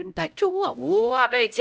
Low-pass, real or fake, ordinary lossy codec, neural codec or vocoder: none; fake; none; codec, 16 kHz, 0.5 kbps, X-Codec, HuBERT features, trained on LibriSpeech